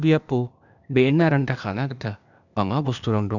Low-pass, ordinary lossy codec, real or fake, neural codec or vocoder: 7.2 kHz; none; fake; codec, 16 kHz, 0.8 kbps, ZipCodec